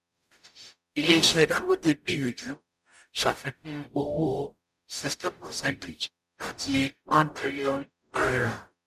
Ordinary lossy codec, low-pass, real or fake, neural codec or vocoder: none; 14.4 kHz; fake; codec, 44.1 kHz, 0.9 kbps, DAC